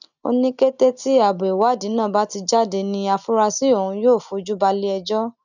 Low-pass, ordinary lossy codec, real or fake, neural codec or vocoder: 7.2 kHz; none; real; none